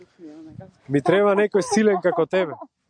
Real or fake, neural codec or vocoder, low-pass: real; none; 9.9 kHz